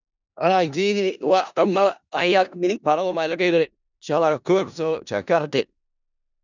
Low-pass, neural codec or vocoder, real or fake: 7.2 kHz; codec, 16 kHz in and 24 kHz out, 0.4 kbps, LongCat-Audio-Codec, four codebook decoder; fake